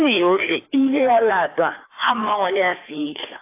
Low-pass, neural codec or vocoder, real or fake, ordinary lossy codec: 3.6 kHz; codec, 16 kHz, 2 kbps, FreqCodec, larger model; fake; AAC, 24 kbps